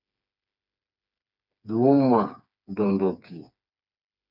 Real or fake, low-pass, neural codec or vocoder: fake; 5.4 kHz; codec, 16 kHz, 4 kbps, FreqCodec, smaller model